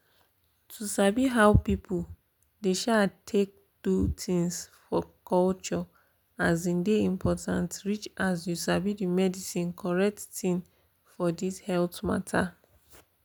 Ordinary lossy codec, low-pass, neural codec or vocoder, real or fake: none; none; none; real